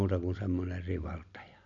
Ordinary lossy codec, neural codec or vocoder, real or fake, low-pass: none; none; real; 7.2 kHz